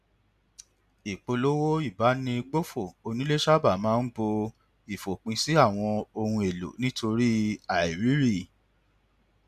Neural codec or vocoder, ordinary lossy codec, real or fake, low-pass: none; none; real; 14.4 kHz